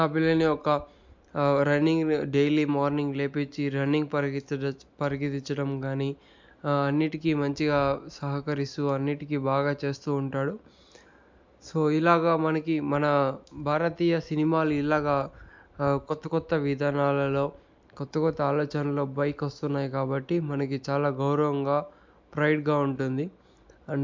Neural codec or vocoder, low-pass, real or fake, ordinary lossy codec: none; 7.2 kHz; real; MP3, 64 kbps